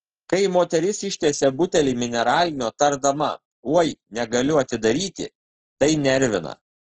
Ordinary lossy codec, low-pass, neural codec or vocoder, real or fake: Opus, 16 kbps; 7.2 kHz; none; real